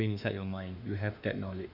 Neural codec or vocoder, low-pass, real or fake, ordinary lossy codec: autoencoder, 48 kHz, 32 numbers a frame, DAC-VAE, trained on Japanese speech; 5.4 kHz; fake; none